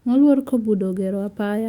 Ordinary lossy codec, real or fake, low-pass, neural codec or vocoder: Opus, 64 kbps; fake; 19.8 kHz; autoencoder, 48 kHz, 128 numbers a frame, DAC-VAE, trained on Japanese speech